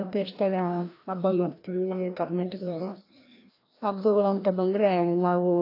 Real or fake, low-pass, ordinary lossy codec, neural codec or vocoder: fake; 5.4 kHz; AAC, 32 kbps; codec, 16 kHz, 1 kbps, FreqCodec, larger model